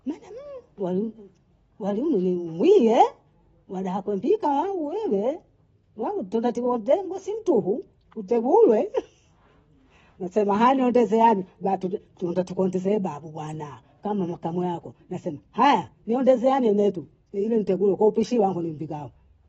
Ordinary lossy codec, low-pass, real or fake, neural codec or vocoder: AAC, 24 kbps; 19.8 kHz; real; none